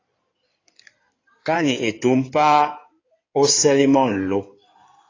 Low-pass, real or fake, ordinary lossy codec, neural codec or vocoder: 7.2 kHz; fake; AAC, 32 kbps; codec, 16 kHz in and 24 kHz out, 2.2 kbps, FireRedTTS-2 codec